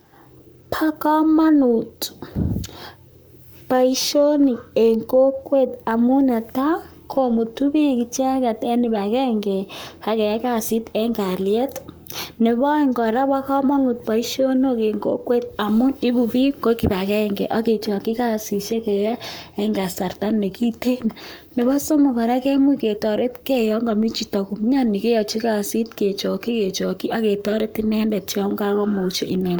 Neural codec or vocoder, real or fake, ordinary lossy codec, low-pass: codec, 44.1 kHz, 7.8 kbps, Pupu-Codec; fake; none; none